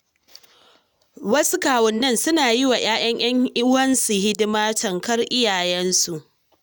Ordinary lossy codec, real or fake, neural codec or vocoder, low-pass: none; real; none; none